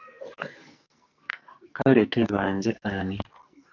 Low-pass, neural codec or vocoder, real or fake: 7.2 kHz; codec, 44.1 kHz, 2.6 kbps, SNAC; fake